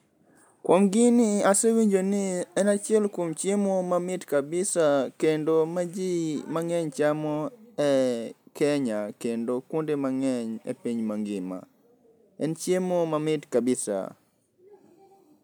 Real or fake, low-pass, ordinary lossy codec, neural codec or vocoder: real; none; none; none